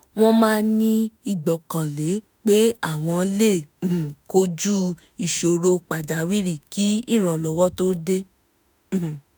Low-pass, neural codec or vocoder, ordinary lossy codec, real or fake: none; autoencoder, 48 kHz, 32 numbers a frame, DAC-VAE, trained on Japanese speech; none; fake